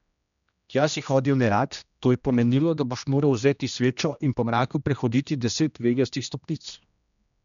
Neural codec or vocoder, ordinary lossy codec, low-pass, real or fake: codec, 16 kHz, 1 kbps, X-Codec, HuBERT features, trained on general audio; none; 7.2 kHz; fake